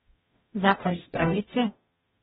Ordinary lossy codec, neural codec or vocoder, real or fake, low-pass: AAC, 16 kbps; codec, 44.1 kHz, 0.9 kbps, DAC; fake; 19.8 kHz